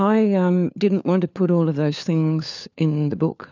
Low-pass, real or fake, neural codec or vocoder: 7.2 kHz; fake; codec, 16 kHz, 4 kbps, FunCodec, trained on LibriTTS, 50 frames a second